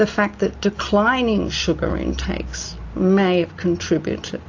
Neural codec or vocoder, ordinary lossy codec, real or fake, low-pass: none; AAC, 48 kbps; real; 7.2 kHz